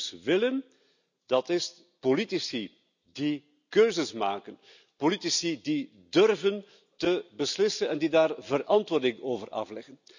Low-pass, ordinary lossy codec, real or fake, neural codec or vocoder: 7.2 kHz; none; real; none